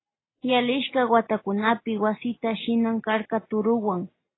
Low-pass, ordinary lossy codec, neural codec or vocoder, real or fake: 7.2 kHz; AAC, 16 kbps; none; real